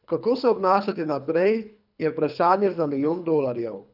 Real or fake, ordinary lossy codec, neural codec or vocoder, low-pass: fake; none; codec, 24 kHz, 3 kbps, HILCodec; 5.4 kHz